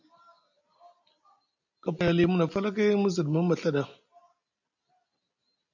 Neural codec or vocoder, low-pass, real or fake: none; 7.2 kHz; real